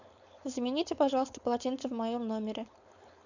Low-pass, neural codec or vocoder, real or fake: 7.2 kHz; codec, 16 kHz, 4.8 kbps, FACodec; fake